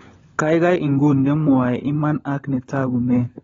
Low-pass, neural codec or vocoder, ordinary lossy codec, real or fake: 7.2 kHz; codec, 16 kHz, 16 kbps, FunCodec, trained on LibriTTS, 50 frames a second; AAC, 24 kbps; fake